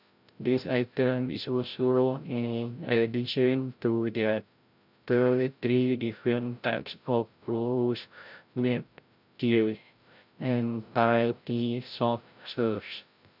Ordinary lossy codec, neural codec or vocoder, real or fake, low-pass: none; codec, 16 kHz, 0.5 kbps, FreqCodec, larger model; fake; 5.4 kHz